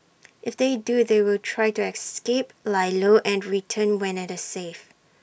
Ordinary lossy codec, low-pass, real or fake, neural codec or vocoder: none; none; real; none